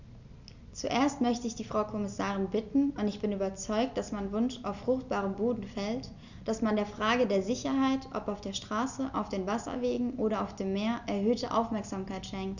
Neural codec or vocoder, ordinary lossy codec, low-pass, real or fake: none; none; 7.2 kHz; real